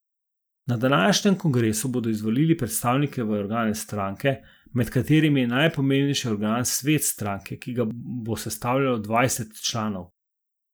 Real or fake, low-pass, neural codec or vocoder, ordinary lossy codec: real; none; none; none